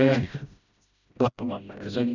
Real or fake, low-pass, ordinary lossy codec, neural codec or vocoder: fake; 7.2 kHz; none; codec, 16 kHz, 0.5 kbps, FreqCodec, smaller model